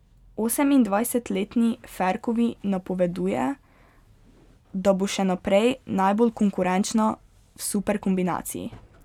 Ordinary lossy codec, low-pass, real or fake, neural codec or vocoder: none; 19.8 kHz; real; none